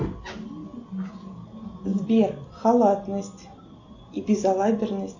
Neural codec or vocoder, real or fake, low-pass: none; real; 7.2 kHz